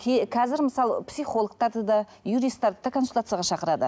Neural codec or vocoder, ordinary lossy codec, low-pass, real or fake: none; none; none; real